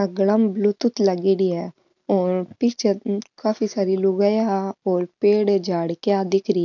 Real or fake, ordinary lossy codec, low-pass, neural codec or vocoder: real; none; 7.2 kHz; none